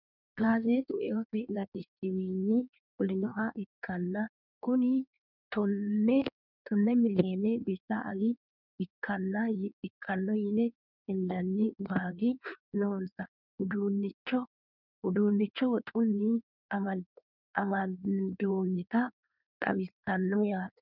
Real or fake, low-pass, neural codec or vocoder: fake; 5.4 kHz; codec, 16 kHz in and 24 kHz out, 1.1 kbps, FireRedTTS-2 codec